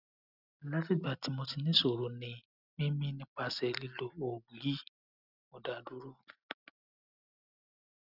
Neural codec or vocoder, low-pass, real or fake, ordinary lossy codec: none; 5.4 kHz; real; none